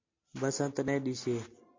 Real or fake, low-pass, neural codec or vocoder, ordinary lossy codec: real; 7.2 kHz; none; MP3, 48 kbps